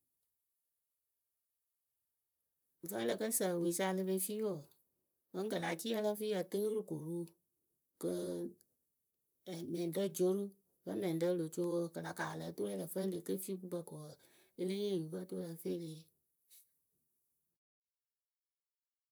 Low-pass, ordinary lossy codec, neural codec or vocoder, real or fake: none; none; vocoder, 44.1 kHz, 128 mel bands, Pupu-Vocoder; fake